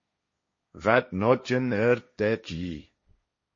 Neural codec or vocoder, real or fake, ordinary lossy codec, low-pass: codec, 16 kHz, 1.1 kbps, Voila-Tokenizer; fake; MP3, 32 kbps; 7.2 kHz